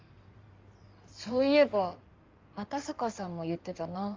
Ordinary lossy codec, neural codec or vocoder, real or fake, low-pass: Opus, 32 kbps; codec, 16 kHz in and 24 kHz out, 2.2 kbps, FireRedTTS-2 codec; fake; 7.2 kHz